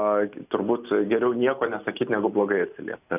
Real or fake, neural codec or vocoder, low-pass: real; none; 3.6 kHz